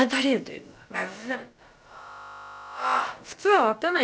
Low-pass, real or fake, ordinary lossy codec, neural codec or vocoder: none; fake; none; codec, 16 kHz, about 1 kbps, DyCAST, with the encoder's durations